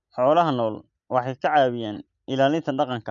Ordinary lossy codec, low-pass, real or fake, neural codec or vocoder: none; 7.2 kHz; real; none